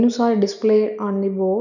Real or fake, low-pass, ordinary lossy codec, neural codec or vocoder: real; 7.2 kHz; none; none